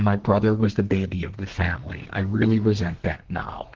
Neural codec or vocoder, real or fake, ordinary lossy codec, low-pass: codec, 32 kHz, 1.9 kbps, SNAC; fake; Opus, 16 kbps; 7.2 kHz